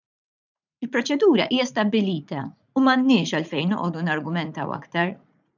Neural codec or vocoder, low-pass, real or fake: vocoder, 44.1 kHz, 80 mel bands, Vocos; 7.2 kHz; fake